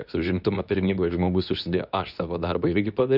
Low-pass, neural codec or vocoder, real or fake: 5.4 kHz; codec, 24 kHz, 0.9 kbps, WavTokenizer, medium speech release version 2; fake